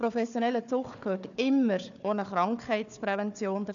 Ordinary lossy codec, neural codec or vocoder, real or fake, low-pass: none; codec, 16 kHz, 4 kbps, FunCodec, trained on Chinese and English, 50 frames a second; fake; 7.2 kHz